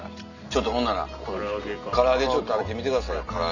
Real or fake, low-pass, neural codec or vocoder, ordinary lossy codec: real; 7.2 kHz; none; none